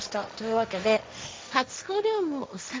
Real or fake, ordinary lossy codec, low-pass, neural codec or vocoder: fake; none; none; codec, 16 kHz, 1.1 kbps, Voila-Tokenizer